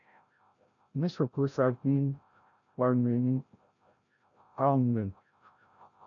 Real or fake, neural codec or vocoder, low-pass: fake; codec, 16 kHz, 0.5 kbps, FreqCodec, larger model; 7.2 kHz